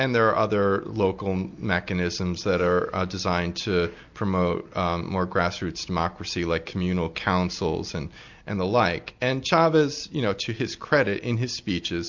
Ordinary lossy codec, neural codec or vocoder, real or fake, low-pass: MP3, 64 kbps; none; real; 7.2 kHz